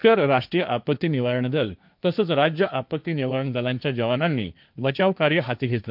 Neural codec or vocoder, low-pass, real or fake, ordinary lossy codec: codec, 16 kHz, 1.1 kbps, Voila-Tokenizer; 5.4 kHz; fake; none